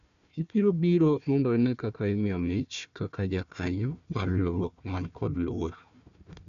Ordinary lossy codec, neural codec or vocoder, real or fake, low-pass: none; codec, 16 kHz, 1 kbps, FunCodec, trained on Chinese and English, 50 frames a second; fake; 7.2 kHz